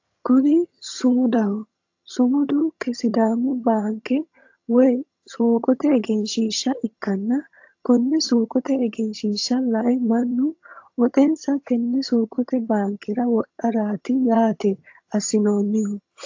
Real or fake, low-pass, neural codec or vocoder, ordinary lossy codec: fake; 7.2 kHz; vocoder, 22.05 kHz, 80 mel bands, HiFi-GAN; AAC, 48 kbps